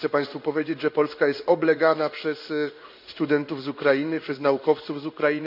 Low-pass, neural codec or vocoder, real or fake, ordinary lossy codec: 5.4 kHz; codec, 16 kHz in and 24 kHz out, 1 kbps, XY-Tokenizer; fake; none